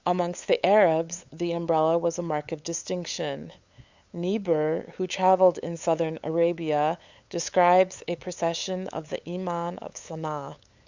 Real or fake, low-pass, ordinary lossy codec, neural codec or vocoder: fake; 7.2 kHz; Opus, 64 kbps; codec, 16 kHz, 8 kbps, FunCodec, trained on LibriTTS, 25 frames a second